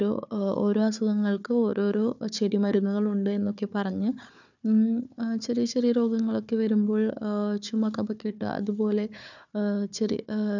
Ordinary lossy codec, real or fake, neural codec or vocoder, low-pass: none; fake; codec, 16 kHz, 4 kbps, FunCodec, trained on Chinese and English, 50 frames a second; 7.2 kHz